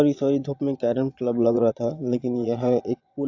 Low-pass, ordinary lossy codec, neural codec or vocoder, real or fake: 7.2 kHz; AAC, 48 kbps; vocoder, 22.05 kHz, 80 mel bands, Vocos; fake